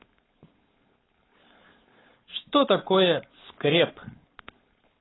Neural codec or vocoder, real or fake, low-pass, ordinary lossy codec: codec, 16 kHz, 4.8 kbps, FACodec; fake; 7.2 kHz; AAC, 16 kbps